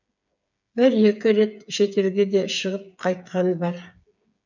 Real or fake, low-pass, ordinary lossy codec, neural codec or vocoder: fake; 7.2 kHz; none; codec, 16 kHz, 8 kbps, FreqCodec, smaller model